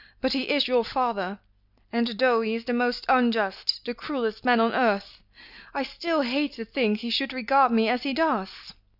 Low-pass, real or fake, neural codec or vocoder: 5.4 kHz; real; none